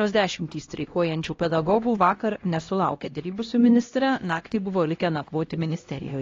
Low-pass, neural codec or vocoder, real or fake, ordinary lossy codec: 7.2 kHz; codec, 16 kHz, 1 kbps, X-Codec, HuBERT features, trained on LibriSpeech; fake; AAC, 32 kbps